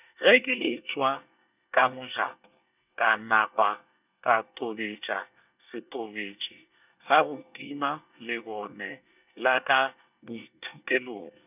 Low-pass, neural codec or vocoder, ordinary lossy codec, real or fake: 3.6 kHz; codec, 24 kHz, 1 kbps, SNAC; none; fake